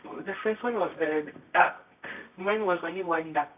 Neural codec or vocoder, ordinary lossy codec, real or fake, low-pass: codec, 24 kHz, 0.9 kbps, WavTokenizer, medium music audio release; AAC, 32 kbps; fake; 3.6 kHz